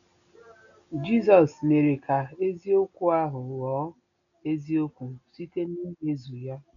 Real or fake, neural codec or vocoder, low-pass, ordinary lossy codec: real; none; 7.2 kHz; none